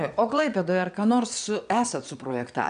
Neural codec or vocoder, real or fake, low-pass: vocoder, 22.05 kHz, 80 mel bands, Vocos; fake; 9.9 kHz